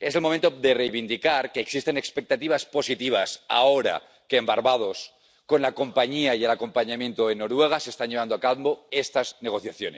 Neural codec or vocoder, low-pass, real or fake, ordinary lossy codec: none; none; real; none